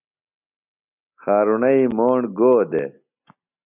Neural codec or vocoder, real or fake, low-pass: none; real; 3.6 kHz